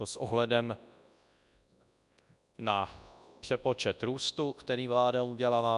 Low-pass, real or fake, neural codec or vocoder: 10.8 kHz; fake; codec, 24 kHz, 0.9 kbps, WavTokenizer, large speech release